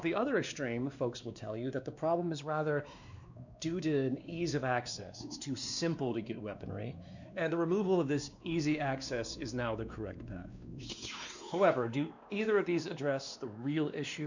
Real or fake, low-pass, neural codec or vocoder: fake; 7.2 kHz; codec, 16 kHz, 2 kbps, X-Codec, WavLM features, trained on Multilingual LibriSpeech